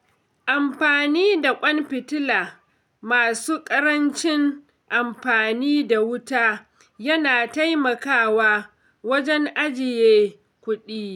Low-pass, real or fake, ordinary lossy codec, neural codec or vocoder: 19.8 kHz; real; none; none